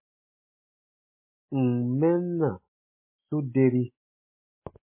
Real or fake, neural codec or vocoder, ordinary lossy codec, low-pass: real; none; MP3, 24 kbps; 3.6 kHz